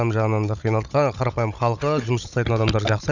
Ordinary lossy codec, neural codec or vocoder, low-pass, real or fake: none; codec, 16 kHz, 16 kbps, FunCodec, trained on Chinese and English, 50 frames a second; 7.2 kHz; fake